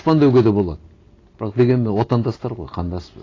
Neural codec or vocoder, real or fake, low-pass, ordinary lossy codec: none; real; 7.2 kHz; AAC, 32 kbps